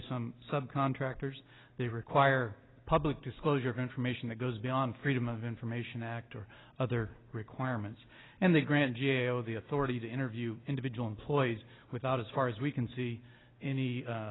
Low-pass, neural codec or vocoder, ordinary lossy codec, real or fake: 7.2 kHz; none; AAC, 16 kbps; real